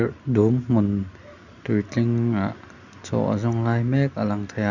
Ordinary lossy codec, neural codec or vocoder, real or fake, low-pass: none; none; real; 7.2 kHz